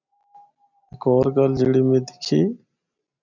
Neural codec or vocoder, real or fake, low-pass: none; real; 7.2 kHz